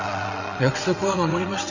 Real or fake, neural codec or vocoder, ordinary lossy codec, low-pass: fake; vocoder, 22.05 kHz, 80 mel bands, WaveNeXt; none; 7.2 kHz